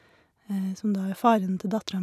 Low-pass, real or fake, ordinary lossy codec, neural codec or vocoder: 14.4 kHz; real; none; none